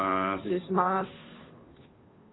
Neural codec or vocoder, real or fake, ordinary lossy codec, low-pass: codec, 16 kHz, 2 kbps, FunCodec, trained on Chinese and English, 25 frames a second; fake; AAC, 16 kbps; 7.2 kHz